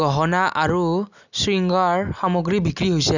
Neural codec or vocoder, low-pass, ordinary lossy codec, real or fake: none; 7.2 kHz; none; real